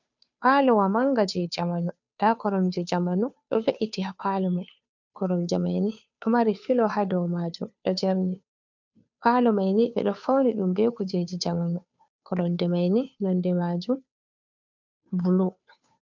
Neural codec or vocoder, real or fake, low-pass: codec, 16 kHz, 2 kbps, FunCodec, trained on Chinese and English, 25 frames a second; fake; 7.2 kHz